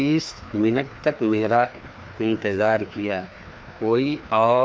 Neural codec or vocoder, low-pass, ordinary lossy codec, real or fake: codec, 16 kHz, 2 kbps, FreqCodec, larger model; none; none; fake